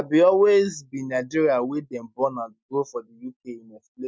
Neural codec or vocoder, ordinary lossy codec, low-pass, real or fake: none; none; none; real